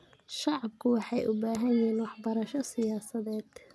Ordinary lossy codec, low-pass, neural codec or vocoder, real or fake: none; none; none; real